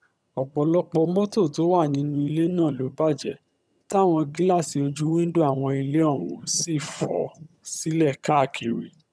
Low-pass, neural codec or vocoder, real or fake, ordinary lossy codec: none; vocoder, 22.05 kHz, 80 mel bands, HiFi-GAN; fake; none